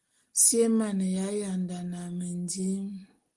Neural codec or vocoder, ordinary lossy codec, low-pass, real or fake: none; Opus, 24 kbps; 10.8 kHz; real